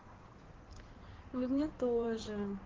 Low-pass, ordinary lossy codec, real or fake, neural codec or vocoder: 7.2 kHz; Opus, 16 kbps; fake; codec, 16 kHz in and 24 kHz out, 2.2 kbps, FireRedTTS-2 codec